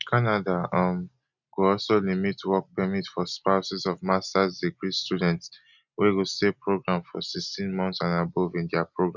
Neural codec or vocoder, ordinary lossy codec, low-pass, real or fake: none; none; 7.2 kHz; real